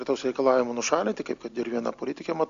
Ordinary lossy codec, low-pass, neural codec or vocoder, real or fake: AAC, 64 kbps; 7.2 kHz; none; real